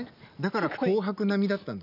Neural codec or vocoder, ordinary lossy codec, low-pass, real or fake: none; none; 5.4 kHz; real